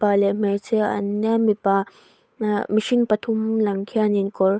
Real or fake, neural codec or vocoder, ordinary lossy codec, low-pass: fake; codec, 16 kHz, 8 kbps, FunCodec, trained on Chinese and English, 25 frames a second; none; none